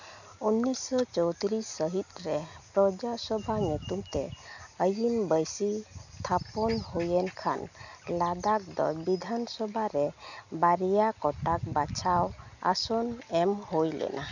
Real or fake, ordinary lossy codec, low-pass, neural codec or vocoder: real; none; 7.2 kHz; none